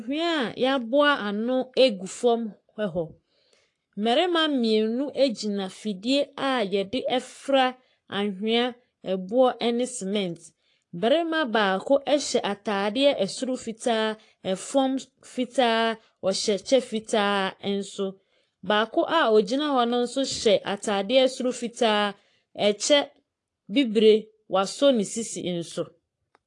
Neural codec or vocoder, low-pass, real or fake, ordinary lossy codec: codec, 44.1 kHz, 7.8 kbps, Pupu-Codec; 10.8 kHz; fake; AAC, 48 kbps